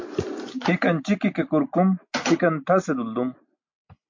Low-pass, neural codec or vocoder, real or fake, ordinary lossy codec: 7.2 kHz; none; real; MP3, 48 kbps